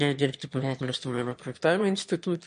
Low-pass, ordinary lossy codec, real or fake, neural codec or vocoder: 9.9 kHz; MP3, 48 kbps; fake; autoencoder, 22.05 kHz, a latent of 192 numbers a frame, VITS, trained on one speaker